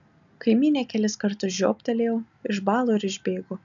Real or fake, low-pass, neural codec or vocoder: real; 7.2 kHz; none